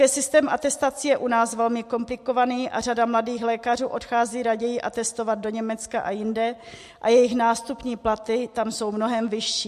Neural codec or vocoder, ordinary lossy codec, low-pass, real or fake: none; MP3, 64 kbps; 14.4 kHz; real